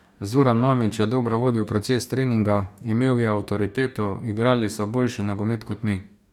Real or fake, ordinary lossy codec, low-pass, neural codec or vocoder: fake; none; 19.8 kHz; codec, 44.1 kHz, 2.6 kbps, DAC